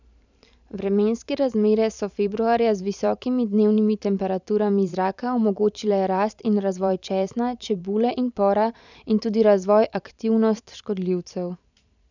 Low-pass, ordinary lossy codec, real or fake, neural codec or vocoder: 7.2 kHz; none; fake; vocoder, 44.1 kHz, 128 mel bands every 512 samples, BigVGAN v2